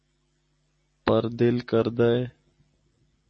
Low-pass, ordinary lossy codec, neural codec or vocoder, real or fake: 10.8 kHz; MP3, 32 kbps; none; real